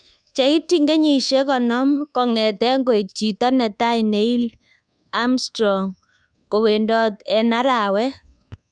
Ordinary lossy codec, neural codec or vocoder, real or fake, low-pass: none; codec, 24 kHz, 1.2 kbps, DualCodec; fake; 9.9 kHz